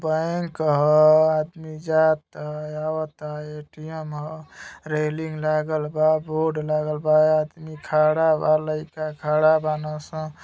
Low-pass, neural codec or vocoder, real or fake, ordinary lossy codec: none; none; real; none